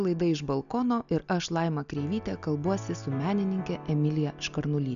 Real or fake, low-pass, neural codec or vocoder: real; 7.2 kHz; none